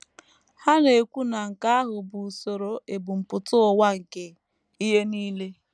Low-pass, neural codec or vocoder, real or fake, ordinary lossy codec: none; none; real; none